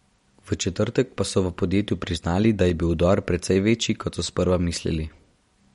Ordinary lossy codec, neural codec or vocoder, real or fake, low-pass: MP3, 48 kbps; none; real; 19.8 kHz